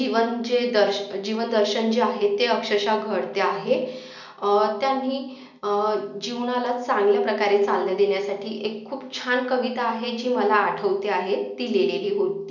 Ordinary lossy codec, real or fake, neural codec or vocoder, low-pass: none; real; none; 7.2 kHz